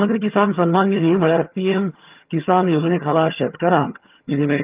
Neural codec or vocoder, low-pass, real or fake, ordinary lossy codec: vocoder, 22.05 kHz, 80 mel bands, HiFi-GAN; 3.6 kHz; fake; Opus, 32 kbps